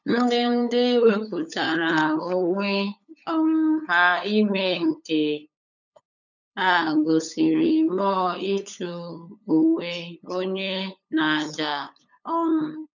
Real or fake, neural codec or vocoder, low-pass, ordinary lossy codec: fake; codec, 16 kHz, 8 kbps, FunCodec, trained on LibriTTS, 25 frames a second; 7.2 kHz; none